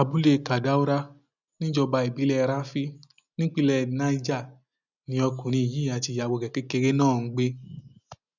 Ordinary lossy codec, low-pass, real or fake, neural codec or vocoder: none; 7.2 kHz; real; none